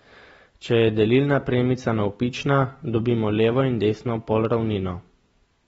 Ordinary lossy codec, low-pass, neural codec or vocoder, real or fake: AAC, 24 kbps; 19.8 kHz; none; real